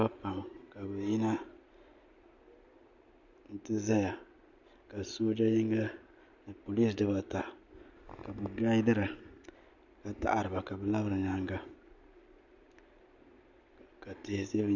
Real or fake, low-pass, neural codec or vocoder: real; 7.2 kHz; none